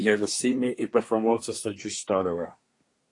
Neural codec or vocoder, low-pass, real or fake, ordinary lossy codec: codec, 24 kHz, 1 kbps, SNAC; 10.8 kHz; fake; AAC, 48 kbps